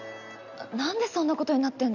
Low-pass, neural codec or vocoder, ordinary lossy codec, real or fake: 7.2 kHz; none; none; real